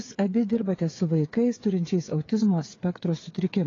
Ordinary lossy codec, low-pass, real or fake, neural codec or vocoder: AAC, 32 kbps; 7.2 kHz; fake; codec, 16 kHz, 8 kbps, FreqCodec, smaller model